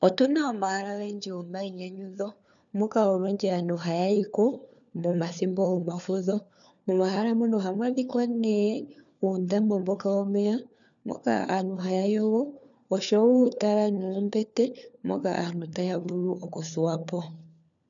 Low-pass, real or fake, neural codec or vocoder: 7.2 kHz; fake; codec, 16 kHz, 4 kbps, FunCodec, trained on LibriTTS, 50 frames a second